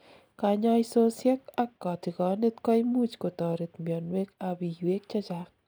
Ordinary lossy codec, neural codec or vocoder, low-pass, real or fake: none; none; none; real